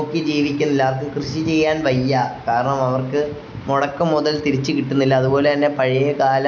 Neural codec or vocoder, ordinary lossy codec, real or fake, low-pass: none; none; real; 7.2 kHz